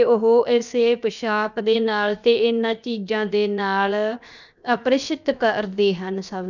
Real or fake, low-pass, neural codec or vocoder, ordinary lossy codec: fake; 7.2 kHz; codec, 16 kHz, 0.7 kbps, FocalCodec; none